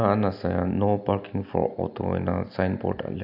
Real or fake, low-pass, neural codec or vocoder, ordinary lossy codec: real; 5.4 kHz; none; AAC, 48 kbps